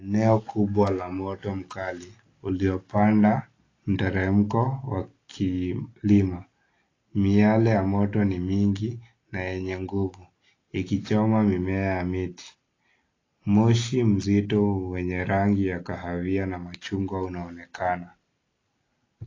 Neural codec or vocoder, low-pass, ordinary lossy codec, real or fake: none; 7.2 kHz; AAC, 32 kbps; real